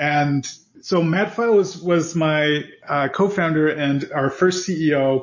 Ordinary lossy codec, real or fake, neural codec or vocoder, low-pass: MP3, 32 kbps; real; none; 7.2 kHz